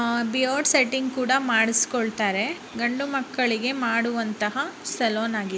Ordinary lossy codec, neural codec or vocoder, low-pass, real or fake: none; none; none; real